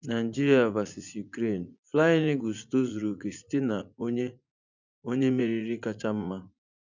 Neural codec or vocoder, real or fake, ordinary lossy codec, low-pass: vocoder, 44.1 kHz, 80 mel bands, Vocos; fake; none; 7.2 kHz